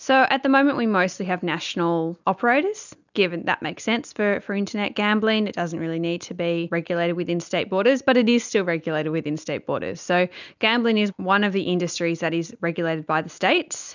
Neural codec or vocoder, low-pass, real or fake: none; 7.2 kHz; real